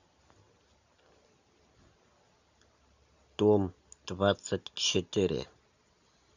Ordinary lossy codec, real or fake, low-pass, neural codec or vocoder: none; real; 7.2 kHz; none